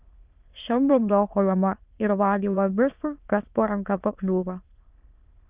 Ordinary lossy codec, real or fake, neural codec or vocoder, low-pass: Opus, 24 kbps; fake; autoencoder, 22.05 kHz, a latent of 192 numbers a frame, VITS, trained on many speakers; 3.6 kHz